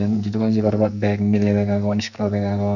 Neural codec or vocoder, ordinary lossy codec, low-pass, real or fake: codec, 44.1 kHz, 2.6 kbps, SNAC; none; 7.2 kHz; fake